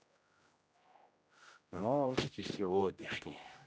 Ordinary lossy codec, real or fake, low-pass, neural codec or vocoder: none; fake; none; codec, 16 kHz, 0.5 kbps, X-Codec, HuBERT features, trained on general audio